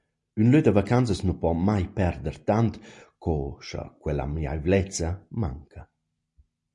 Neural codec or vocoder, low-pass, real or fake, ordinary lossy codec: none; 10.8 kHz; real; MP3, 48 kbps